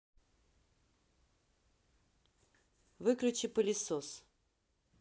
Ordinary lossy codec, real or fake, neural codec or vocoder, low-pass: none; real; none; none